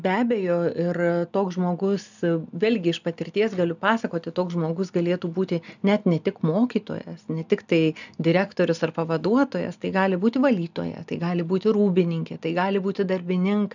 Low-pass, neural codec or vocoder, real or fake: 7.2 kHz; none; real